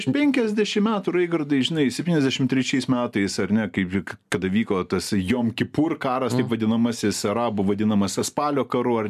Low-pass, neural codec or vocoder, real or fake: 14.4 kHz; none; real